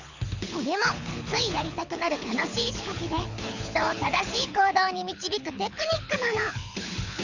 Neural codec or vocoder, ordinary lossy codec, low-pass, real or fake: codec, 24 kHz, 6 kbps, HILCodec; none; 7.2 kHz; fake